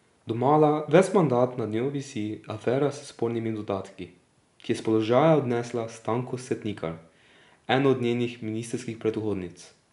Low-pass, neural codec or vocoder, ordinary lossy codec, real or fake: 10.8 kHz; none; none; real